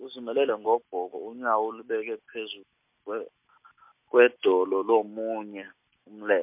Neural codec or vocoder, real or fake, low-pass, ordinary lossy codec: none; real; 3.6 kHz; MP3, 32 kbps